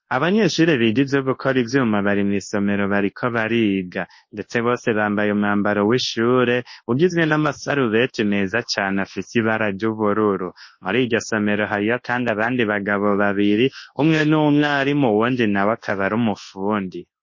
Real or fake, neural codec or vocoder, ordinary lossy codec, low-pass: fake; codec, 24 kHz, 0.9 kbps, WavTokenizer, large speech release; MP3, 32 kbps; 7.2 kHz